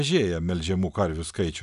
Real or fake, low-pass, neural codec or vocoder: real; 10.8 kHz; none